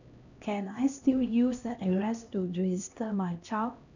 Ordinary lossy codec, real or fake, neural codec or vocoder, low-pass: none; fake; codec, 16 kHz, 1 kbps, X-Codec, HuBERT features, trained on LibriSpeech; 7.2 kHz